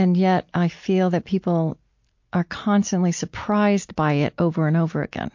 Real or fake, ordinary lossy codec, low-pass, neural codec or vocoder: real; MP3, 48 kbps; 7.2 kHz; none